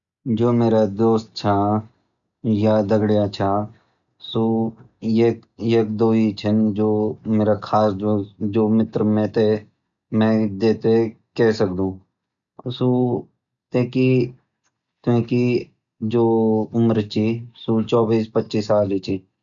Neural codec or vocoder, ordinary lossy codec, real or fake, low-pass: none; none; real; 7.2 kHz